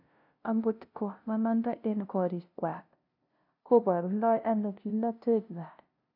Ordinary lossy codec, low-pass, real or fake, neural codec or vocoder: AAC, 48 kbps; 5.4 kHz; fake; codec, 16 kHz, 0.5 kbps, FunCodec, trained on LibriTTS, 25 frames a second